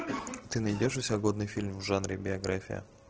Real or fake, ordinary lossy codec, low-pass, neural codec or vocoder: real; Opus, 16 kbps; 7.2 kHz; none